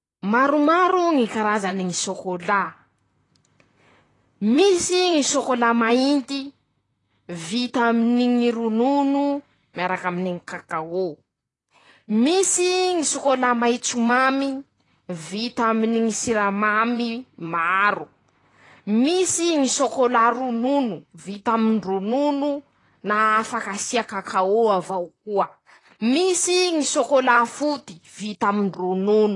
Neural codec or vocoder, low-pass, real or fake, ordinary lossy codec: vocoder, 44.1 kHz, 128 mel bands, Pupu-Vocoder; 10.8 kHz; fake; AAC, 32 kbps